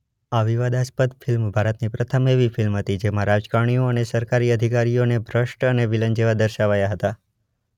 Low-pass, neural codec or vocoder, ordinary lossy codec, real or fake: 19.8 kHz; none; none; real